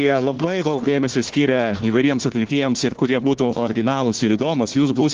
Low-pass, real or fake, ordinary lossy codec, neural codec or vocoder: 7.2 kHz; fake; Opus, 16 kbps; codec, 16 kHz, 1 kbps, FunCodec, trained on Chinese and English, 50 frames a second